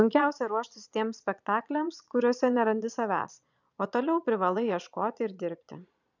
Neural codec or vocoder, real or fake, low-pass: vocoder, 22.05 kHz, 80 mel bands, Vocos; fake; 7.2 kHz